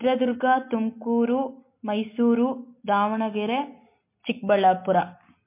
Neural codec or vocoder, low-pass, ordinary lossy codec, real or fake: none; 3.6 kHz; MP3, 24 kbps; real